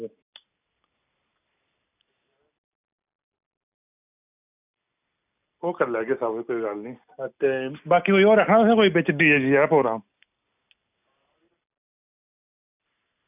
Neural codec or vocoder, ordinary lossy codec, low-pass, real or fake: none; none; 3.6 kHz; real